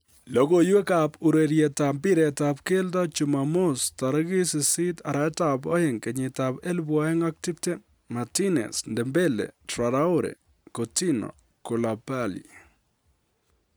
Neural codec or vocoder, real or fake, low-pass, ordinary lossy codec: none; real; none; none